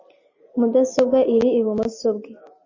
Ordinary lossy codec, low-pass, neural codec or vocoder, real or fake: MP3, 32 kbps; 7.2 kHz; none; real